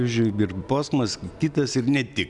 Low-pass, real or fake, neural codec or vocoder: 10.8 kHz; real; none